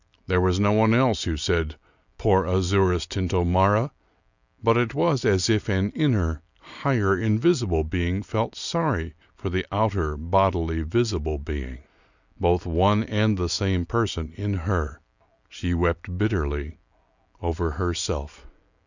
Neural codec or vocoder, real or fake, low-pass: none; real; 7.2 kHz